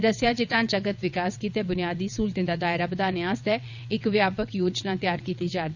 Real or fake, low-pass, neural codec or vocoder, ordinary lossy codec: fake; 7.2 kHz; vocoder, 22.05 kHz, 80 mel bands, WaveNeXt; none